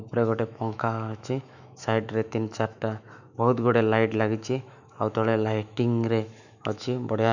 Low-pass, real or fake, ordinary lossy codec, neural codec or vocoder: 7.2 kHz; fake; none; autoencoder, 48 kHz, 128 numbers a frame, DAC-VAE, trained on Japanese speech